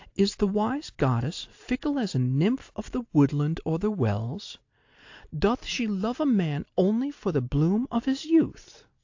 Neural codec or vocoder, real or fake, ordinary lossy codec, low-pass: none; real; AAC, 48 kbps; 7.2 kHz